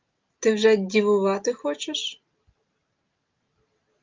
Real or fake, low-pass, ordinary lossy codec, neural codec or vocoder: real; 7.2 kHz; Opus, 32 kbps; none